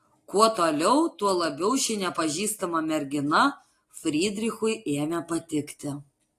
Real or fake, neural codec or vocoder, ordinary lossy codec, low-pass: real; none; AAC, 48 kbps; 14.4 kHz